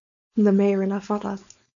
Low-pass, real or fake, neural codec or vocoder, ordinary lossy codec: 7.2 kHz; fake; codec, 16 kHz, 4.8 kbps, FACodec; MP3, 96 kbps